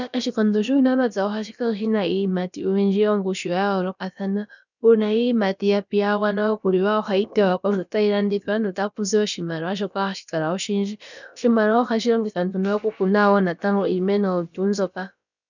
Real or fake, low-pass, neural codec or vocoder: fake; 7.2 kHz; codec, 16 kHz, about 1 kbps, DyCAST, with the encoder's durations